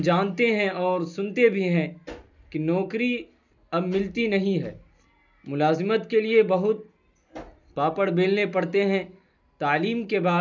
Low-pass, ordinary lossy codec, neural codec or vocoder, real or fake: 7.2 kHz; none; none; real